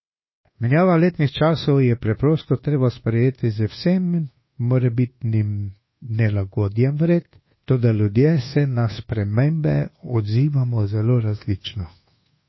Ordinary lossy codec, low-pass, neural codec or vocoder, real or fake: MP3, 24 kbps; 7.2 kHz; codec, 24 kHz, 1.2 kbps, DualCodec; fake